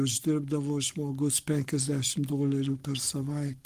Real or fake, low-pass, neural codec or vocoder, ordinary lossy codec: fake; 14.4 kHz; codec, 44.1 kHz, 7.8 kbps, Pupu-Codec; Opus, 24 kbps